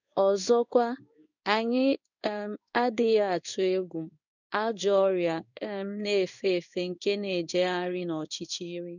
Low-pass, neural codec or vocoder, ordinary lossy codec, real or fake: 7.2 kHz; codec, 16 kHz in and 24 kHz out, 1 kbps, XY-Tokenizer; none; fake